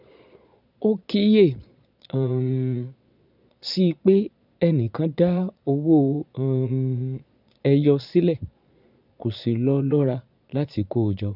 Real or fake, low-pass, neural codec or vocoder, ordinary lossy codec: fake; 5.4 kHz; vocoder, 22.05 kHz, 80 mel bands, Vocos; none